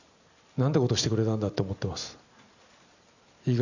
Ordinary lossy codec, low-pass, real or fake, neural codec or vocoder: none; 7.2 kHz; real; none